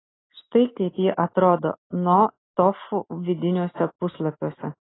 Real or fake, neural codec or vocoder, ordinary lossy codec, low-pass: real; none; AAC, 16 kbps; 7.2 kHz